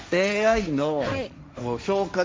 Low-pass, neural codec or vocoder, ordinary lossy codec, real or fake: none; codec, 16 kHz, 1.1 kbps, Voila-Tokenizer; none; fake